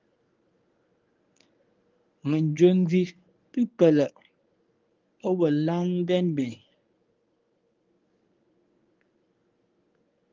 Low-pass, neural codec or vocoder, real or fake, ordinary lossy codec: 7.2 kHz; codec, 24 kHz, 0.9 kbps, WavTokenizer, medium speech release version 1; fake; Opus, 32 kbps